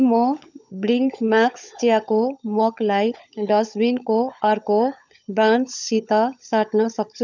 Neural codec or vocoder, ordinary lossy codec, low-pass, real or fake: codec, 16 kHz, 16 kbps, FunCodec, trained on LibriTTS, 50 frames a second; none; 7.2 kHz; fake